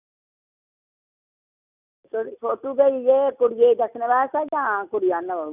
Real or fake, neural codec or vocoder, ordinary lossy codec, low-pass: real; none; none; 3.6 kHz